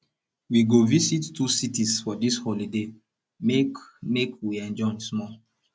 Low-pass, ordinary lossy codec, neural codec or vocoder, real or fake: none; none; none; real